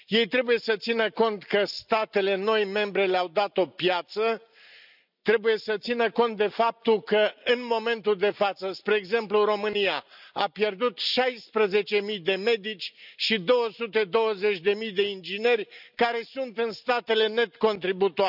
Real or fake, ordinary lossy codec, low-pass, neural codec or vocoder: real; none; 5.4 kHz; none